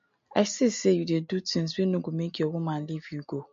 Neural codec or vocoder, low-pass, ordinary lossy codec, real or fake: none; 7.2 kHz; MP3, 48 kbps; real